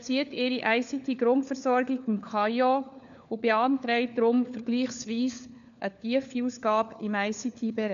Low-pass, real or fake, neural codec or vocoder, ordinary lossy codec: 7.2 kHz; fake; codec, 16 kHz, 4 kbps, FunCodec, trained on LibriTTS, 50 frames a second; none